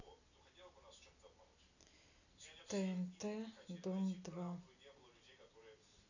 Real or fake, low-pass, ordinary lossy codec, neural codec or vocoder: real; 7.2 kHz; none; none